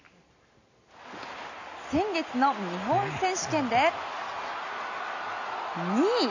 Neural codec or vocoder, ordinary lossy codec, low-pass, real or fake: none; none; 7.2 kHz; real